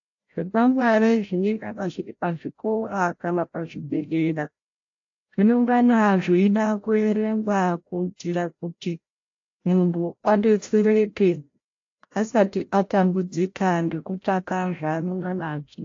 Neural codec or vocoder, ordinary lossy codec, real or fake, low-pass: codec, 16 kHz, 0.5 kbps, FreqCodec, larger model; AAC, 48 kbps; fake; 7.2 kHz